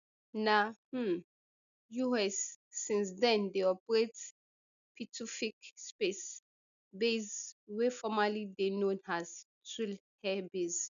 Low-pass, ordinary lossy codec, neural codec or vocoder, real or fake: 7.2 kHz; none; none; real